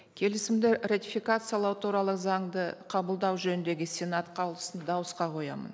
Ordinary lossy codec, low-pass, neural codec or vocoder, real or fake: none; none; none; real